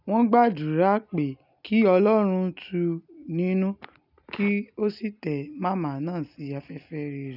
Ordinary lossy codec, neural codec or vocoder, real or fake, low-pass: none; none; real; 5.4 kHz